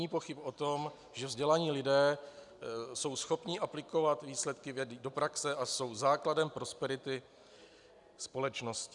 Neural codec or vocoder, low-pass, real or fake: none; 10.8 kHz; real